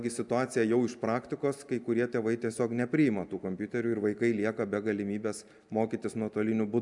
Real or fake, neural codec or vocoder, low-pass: real; none; 10.8 kHz